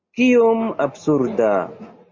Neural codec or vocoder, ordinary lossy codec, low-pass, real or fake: none; MP3, 32 kbps; 7.2 kHz; real